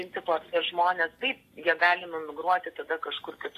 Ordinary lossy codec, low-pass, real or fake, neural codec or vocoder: AAC, 48 kbps; 14.4 kHz; real; none